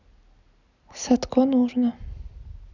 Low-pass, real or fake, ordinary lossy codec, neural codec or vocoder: 7.2 kHz; real; none; none